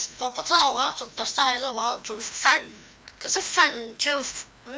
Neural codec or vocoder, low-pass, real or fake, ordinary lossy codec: codec, 16 kHz, 1 kbps, FreqCodec, larger model; none; fake; none